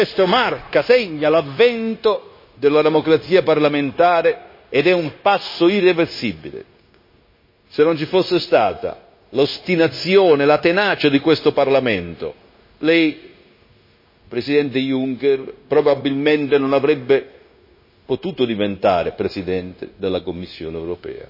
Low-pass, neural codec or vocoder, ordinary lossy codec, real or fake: 5.4 kHz; codec, 16 kHz, 0.9 kbps, LongCat-Audio-Codec; MP3, 24 kbps; fake